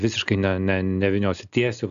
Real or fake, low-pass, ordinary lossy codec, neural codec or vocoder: real; 7.2 kHz; AAC, 96 kbps; none